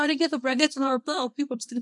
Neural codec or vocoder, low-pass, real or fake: codec, 24 kHz, 0.9 kbps, WavTokenizer, small release; 10.8 kHz; fake